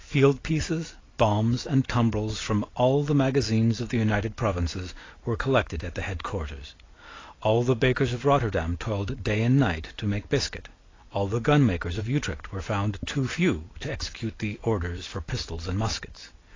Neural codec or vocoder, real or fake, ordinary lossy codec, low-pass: none; real; AAC, 32 kbps; 7.2 kHz